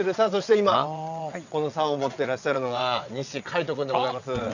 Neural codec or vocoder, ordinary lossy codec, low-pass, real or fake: vocoder, 22.05 kHz, 80 mel bands, WaveNeXt; none; 7.2 kHz; fake